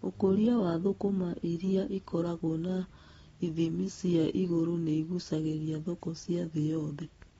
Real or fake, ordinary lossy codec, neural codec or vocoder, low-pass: real; AAC, 24 kbps; none; 19.8 kHz